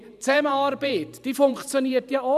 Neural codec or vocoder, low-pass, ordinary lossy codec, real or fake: vocoder, 44.1 kHz, 128 mel bands every 512 samples, BigVGAN v2; 14.4 kHz; none; fake